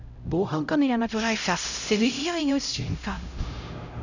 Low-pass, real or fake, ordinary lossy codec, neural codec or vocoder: 7.2 kHz; fake; none; codec, 16 kHz, 0.5 kbps, X-Codec, HuBERT features, trained on LibriSpeech